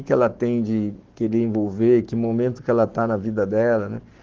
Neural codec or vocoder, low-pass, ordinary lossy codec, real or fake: codec, 44.1 kHz, 7.8 kbps, Pupu-Codec; 7.2 kHz; Opus, 16 kbps; fake